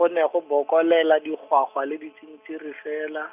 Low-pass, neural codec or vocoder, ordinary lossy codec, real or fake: 3.6 kHz; none; none; real